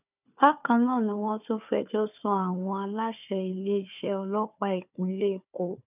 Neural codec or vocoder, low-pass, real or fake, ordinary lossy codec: codec, 16 kHz, 4 kbps, FunCodec, trained on Chinese and English, 50 frames a second; 3.6 kHz; fake; none